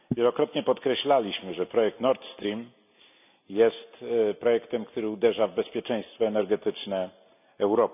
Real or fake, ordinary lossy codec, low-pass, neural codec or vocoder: real; none; 3.6 kHz; none